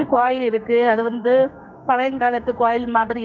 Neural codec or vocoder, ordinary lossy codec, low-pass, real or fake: codec, 32 kHz, 1.9 kbps, SNAC; none; 7.2 kHz; fake